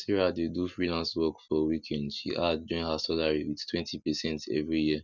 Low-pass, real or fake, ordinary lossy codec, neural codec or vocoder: 7.2 kHz; real; none; none